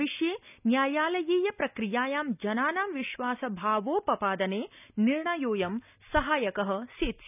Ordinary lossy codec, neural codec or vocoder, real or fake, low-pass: none; none; real; 3.6 kHz